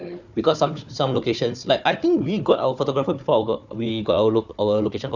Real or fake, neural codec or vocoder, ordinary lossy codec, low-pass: fake; codec, 16 kHz, 4 kbps, FunCodec, trained on Chinese and English, 50 frames a second; none; 7.2 kHz